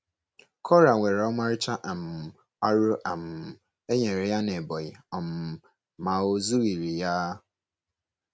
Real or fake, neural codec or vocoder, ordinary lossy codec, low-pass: real; none; none; none